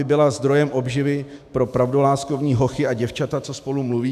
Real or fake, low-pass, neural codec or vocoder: fake; 14.4 kHz; autoencoder, 48 kHz, 128 numbers a frame, DAC-VAE, trained on Japanese speech